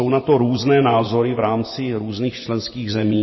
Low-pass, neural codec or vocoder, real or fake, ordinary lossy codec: 7.2 kHz; vocoder, 24 kHz, 100 mel bands, Vocos; fake; MP3, 24 kbps